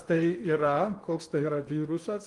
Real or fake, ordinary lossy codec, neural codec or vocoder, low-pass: fake; Opus, 24 kbps; codec, 16 kHz in and 24 kHz out, 0.8 kbps, FocalCodec, streaming, 65536 codes; 10.8 kHz